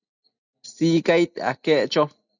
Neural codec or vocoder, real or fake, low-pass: none; real; 7.2 kHz